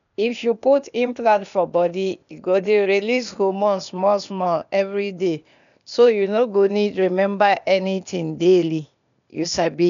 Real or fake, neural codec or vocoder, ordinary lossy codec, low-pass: fake; codec, 16 kHz, 0.8 kbps, ZipCodec; none; 7.2 kHz